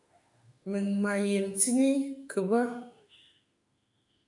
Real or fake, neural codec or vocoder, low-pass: fake; autoencoder, 48 kHz, 32 numbers a frame, DAC-VAE, trained on Japanese speech; 10.8 kHz